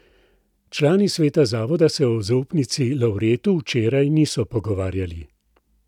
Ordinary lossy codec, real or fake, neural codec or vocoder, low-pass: none; real; none; 19.8 kHz